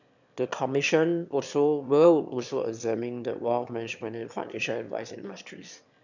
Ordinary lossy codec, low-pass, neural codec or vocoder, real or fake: none; 7.2 kHz; autoencoder, 22.05 kHz, a latent of 192 numbers a frame, VITS, trained on one speaker; fake